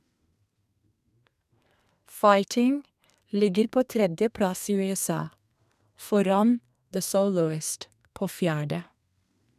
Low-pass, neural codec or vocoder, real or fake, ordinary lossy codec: 14.4 kHz; codec, 32 kHz, 1.9 kbps, SNAC; fake; none